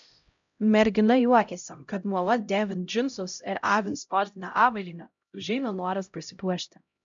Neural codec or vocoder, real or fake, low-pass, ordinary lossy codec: codec, 16 kHz, 0.5 kbps, X-Codec, HuBERT features, trained on LibriSpeech; fake; 7.2 kHz; MP3, 96 kbps